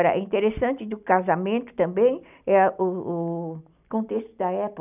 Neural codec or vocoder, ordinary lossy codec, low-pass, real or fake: none; none; 3.6 kHz; real